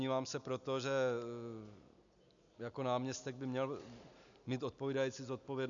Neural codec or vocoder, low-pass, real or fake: none; 7.2 kHz; real